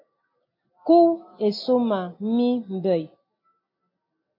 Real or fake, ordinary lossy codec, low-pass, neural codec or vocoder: real; MP3, 32 kbps; 5.4 kHz; none